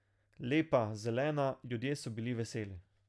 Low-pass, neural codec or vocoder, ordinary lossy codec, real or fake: none; none; none; real